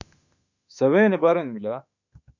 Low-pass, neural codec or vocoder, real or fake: 7.2 kHz; autoencoder, 48 kHz, 32 numbers a frame, DAC-VAE, trained on Japanese speech; fake